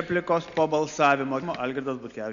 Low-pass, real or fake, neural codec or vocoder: 7.2 kHz; real; none